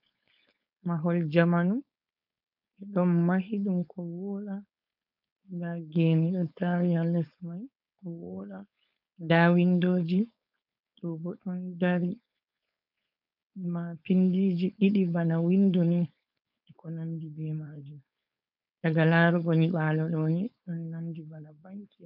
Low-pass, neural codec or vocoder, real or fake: 5.4 kHz; codec, 16 kHz, 4.8 kbps, FACodec; fake